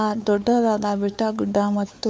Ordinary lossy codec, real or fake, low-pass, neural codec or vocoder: none; fake; none; codec, 16 kHz, 4 kbps, X-Codec, WavLM features, trained on Multilingual LibriSpeech